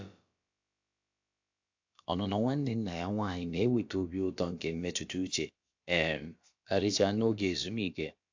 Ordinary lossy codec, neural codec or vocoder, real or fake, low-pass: AAC, 48 kbps; codec, 16 kHz, about 1 kbps, DyCAST, with the encoder's durations; fake; 7.2 kHz